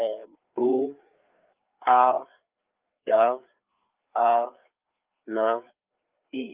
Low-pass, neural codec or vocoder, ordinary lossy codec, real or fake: 3.6 kHz; codec, 16 kHz, 4 kbps, FreqCodec, larger model; Opus, 24 kbps; fake